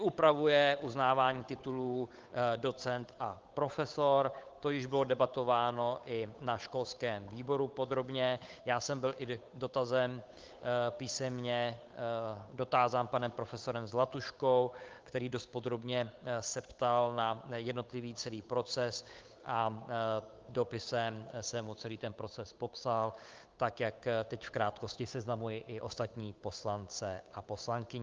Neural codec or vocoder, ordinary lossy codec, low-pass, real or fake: codec, 16 kHz, 8 kbps, FunCodec, trained on Chinese and English, 25 frames a second; Opus, 24 kbps; 7.2 kHz; fake